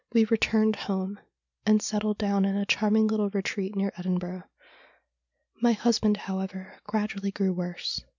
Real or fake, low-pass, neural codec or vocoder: real; 7.2 kHz; none